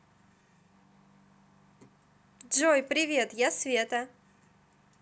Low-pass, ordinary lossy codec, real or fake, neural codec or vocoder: none; none; real; none